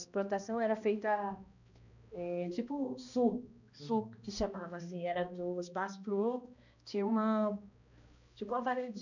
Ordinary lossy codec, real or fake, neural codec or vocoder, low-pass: none; fake; codec, 16 kHz, 1 kbps, X-Codec, HuBERT features, trained on balanced general audio; 7.2 kHz